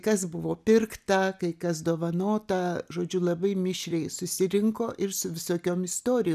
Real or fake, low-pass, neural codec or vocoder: real; 14.4 kHz; none